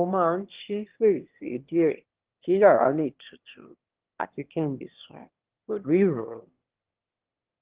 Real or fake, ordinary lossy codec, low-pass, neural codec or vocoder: fake; Opus, 16 kbps; 3.6 kHz; autoencoder, 22.05 kHz, a latent of 192 numbers a frame, VITS, trained on one speaker